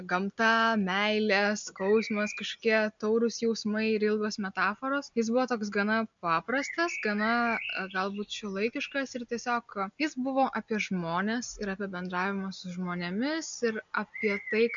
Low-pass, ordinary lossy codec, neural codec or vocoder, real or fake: 7.2 kHz; MP3, 64 kbps; none; real